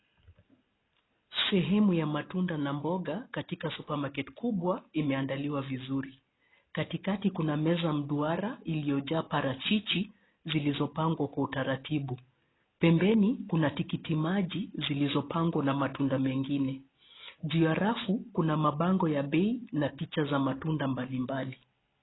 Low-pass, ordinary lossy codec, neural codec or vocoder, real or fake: 7.2 kHz; AAC, 16 kbps; none; real